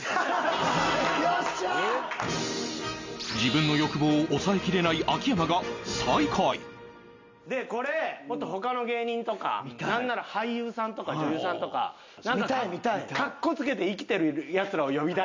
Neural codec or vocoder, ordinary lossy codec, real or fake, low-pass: none; MP3, 64 kbps; real; 7.2 kHz